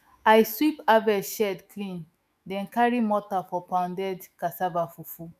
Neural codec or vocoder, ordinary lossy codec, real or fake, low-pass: autoencoder, 48 kHz, 128 numbers a frame, DAC-VAE, trained on Japanese speech; none; fake; 14.4 kHz